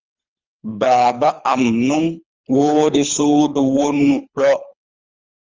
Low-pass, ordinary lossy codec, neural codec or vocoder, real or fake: 7.2 kHz; Opus, 32 kbps; codec, 24 kHz, 3 kbps, HILCodec; fake